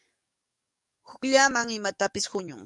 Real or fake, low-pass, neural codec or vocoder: fake; 10.8 kHz; codec, 44.1 kHz, 7.8 kbps, DAC